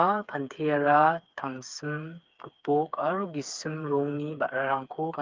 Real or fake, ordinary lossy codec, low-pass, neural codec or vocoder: fake; Opus, 24 kbps; 7.2 kHz; codec, 16 kHz, 4 kbps, FreqCodec, smaller model